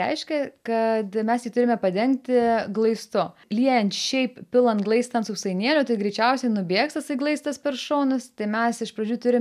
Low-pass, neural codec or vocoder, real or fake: 14.4 kHz; none; real